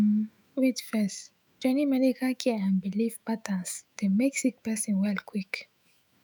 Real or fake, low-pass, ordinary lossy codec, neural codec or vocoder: fake; none; none; autoencoder, 48 kHz, 128 numbers a frame, DAC-VAE, trained on Japanese speech